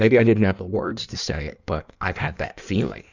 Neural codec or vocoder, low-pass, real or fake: codec, 16 kHz in and 24 kHz out, 1.1 kbps, FireRedTTS-2 codec; 7.2 kHz; fake